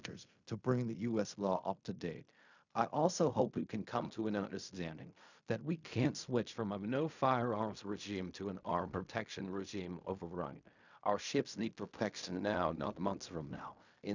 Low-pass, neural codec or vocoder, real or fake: 7.2 kHz; codec, 16 kHz in and 24 kHz out, 0.4 kbps, LongCat-Audio-Codec, fine tuned four codebook decoder; fake